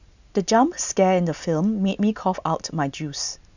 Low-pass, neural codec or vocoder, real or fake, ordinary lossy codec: 7.2 kHz; none; real; none